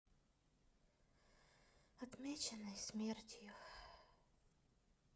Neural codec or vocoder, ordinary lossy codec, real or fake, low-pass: codec, 16 kHz, 16 kbps, FreqCodec, smaller model; none; fake; none